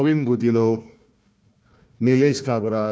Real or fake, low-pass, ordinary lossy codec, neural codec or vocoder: fake; none; none; codec, 16 kHz, 1 kbps, FunCodec, trained on Chinese and English, 50 frames a second